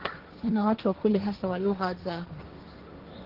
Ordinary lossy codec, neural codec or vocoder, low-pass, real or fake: Opus, 16 kbps; codec, 16 kHz, 1.1 kbps, Voila-Tokenizer; 5.4 kHz; fake